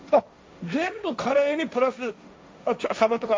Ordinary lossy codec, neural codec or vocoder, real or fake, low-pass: none; codec, 16 kHz, 1.1 kbps, Voila-Tokenizer; fake; none